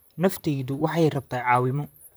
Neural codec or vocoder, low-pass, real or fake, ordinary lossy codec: vocoder, 44.1 kHz, 128 mel bands, Pupu-Vocoder; none; fake; none